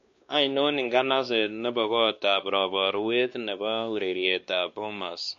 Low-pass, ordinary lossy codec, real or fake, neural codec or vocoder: 7.2 kHz; MP3, 48 kbps; fake; codec, 16 kHz, 2 kbps, X-Codec, WavLM features, trained on Multilingual LibriSpeech